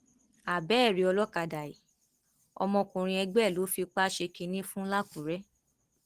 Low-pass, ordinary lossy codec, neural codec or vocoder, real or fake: 14.4 kHz; Opus, 16 kbps; none; real